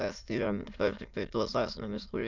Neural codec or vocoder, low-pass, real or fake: autoencoder, 22.05 kHz, a latent of 192 numbers a frame, VITS, trained on many speakers; 7.2 kHz; fake